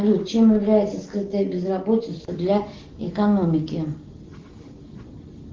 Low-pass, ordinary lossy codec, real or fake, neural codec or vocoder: 7.2 kHz; Opus, 16 kbps; real; none